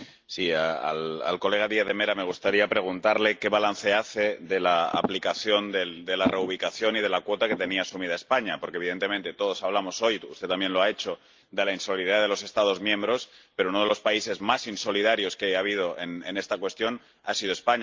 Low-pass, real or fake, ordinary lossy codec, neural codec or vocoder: 7.2 kHz; real; Opus, 32 kbps; none